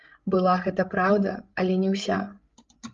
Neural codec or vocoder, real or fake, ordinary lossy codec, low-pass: codec, 16 kHz, 16 kbps, FreqCodec, larger model; fake; Opus, 32 kbps; 7.2 kHz